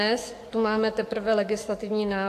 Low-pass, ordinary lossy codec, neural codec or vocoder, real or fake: 14.4 kHz; AAC, 64 kbps; codec, 44.1 kHz, 7.8 kbps, DAC; fake